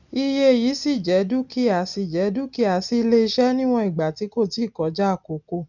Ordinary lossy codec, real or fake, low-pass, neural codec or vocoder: none; real; 7.2 kHz; none